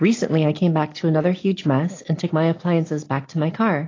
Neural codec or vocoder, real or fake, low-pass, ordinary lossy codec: none; real; 7.2 kHz; AAC, 32 kbps